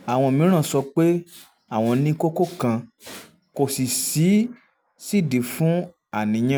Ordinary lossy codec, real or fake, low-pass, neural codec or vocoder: none; real; none; none